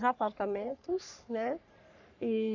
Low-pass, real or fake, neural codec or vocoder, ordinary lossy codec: 7.2 kHz; fake; codec, 44.1 kHz, 3.4 kbps, Pupu-Codec; none